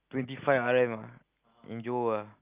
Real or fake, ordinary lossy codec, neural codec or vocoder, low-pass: real; Opus, 24 kbps; none; 3.6 kHz